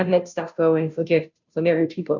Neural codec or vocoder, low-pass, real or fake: codec, 16 kHz, 0.5 kbps, FunCodec, trained on Chinese and English, 25 frames a second; 7.2 kHz; fake